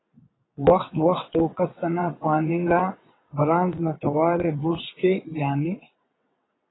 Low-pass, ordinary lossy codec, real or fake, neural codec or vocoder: 7.2 kHz; AAC, 16 kbps; fake; vocoder, 22.05 kHz, 80 mel bands, WaveNeXt